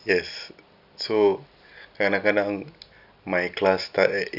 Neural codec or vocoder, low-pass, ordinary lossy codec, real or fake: none; 5.4 kHz; none; real